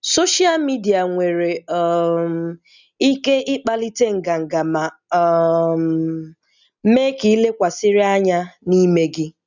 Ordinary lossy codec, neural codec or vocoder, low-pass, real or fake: none; none; 7.2 kHz; real